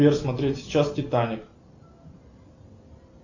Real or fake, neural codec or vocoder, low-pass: real; none; 7.2 kHz